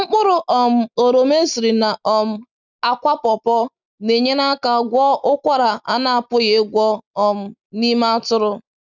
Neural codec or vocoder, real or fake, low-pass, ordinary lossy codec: none; real; 7.2 kHz; none